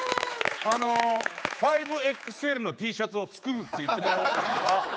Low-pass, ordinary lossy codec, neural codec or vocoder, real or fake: none; none; codec, 16 kHz, 4 kbps, X-Codec, HuBERT features, trained on general audio; fake